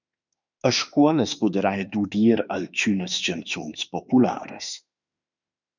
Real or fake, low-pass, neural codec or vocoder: fake; 7.2 kHz; autoencoder, 48 kHz, 32 numbers a frame, DAC-VAE, trained on Japanese speech